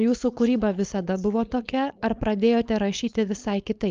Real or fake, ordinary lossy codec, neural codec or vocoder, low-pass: fake; Opus, 32 kbps; codec, 16 kHz, 4.8 kbps, FACodec; 7.2 kHz